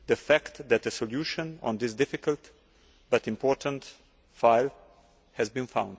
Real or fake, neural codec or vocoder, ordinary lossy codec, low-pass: real; none; none; none